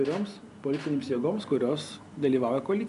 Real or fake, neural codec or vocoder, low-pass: real; none; 10.8 kHz